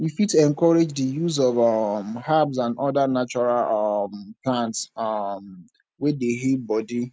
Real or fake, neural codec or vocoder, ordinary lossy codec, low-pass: real; none; none; none